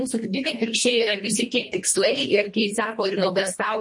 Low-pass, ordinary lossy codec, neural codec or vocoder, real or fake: 10.8 kHz; MP3, 48 kbps; codec, 24 kHz, 1.5 kbps, HILCodec; fake